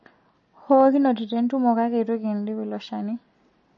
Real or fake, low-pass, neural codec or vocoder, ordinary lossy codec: real; 7.2 kHz; none; MP3, 32 kbps